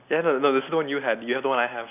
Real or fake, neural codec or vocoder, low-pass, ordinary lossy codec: real; none; 3.6 kHz; none